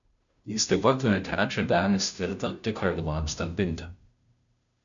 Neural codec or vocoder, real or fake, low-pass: codec, 16 kHz, 0.5 kbps, FunCodec, trained on Chinese and English, 25 frames a second; fake; 7.2 kHz